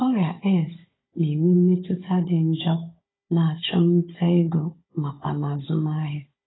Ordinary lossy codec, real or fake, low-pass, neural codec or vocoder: AAC, 16 kbps; fake; 7.2 kHz; codec, 16 kHz, 16 kbps, FunCodec, trained on Chinese and English, 50 frames a second